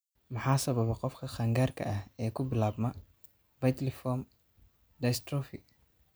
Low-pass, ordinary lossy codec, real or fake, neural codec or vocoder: none; none; real; none